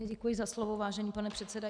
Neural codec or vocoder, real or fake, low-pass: none; real; 9.9 kHz